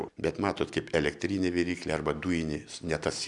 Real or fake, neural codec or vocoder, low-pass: real; none; 10.8 kHz